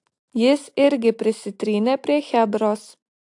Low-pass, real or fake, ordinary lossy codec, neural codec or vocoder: 10.8 kHz; real; AAC, 64 kbps; none